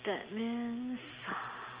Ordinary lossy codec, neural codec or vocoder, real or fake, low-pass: Opus, 24 kbps; none; real; 3.6 kHz